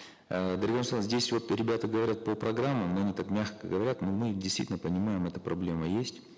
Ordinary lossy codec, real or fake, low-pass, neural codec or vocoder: none; real; none; none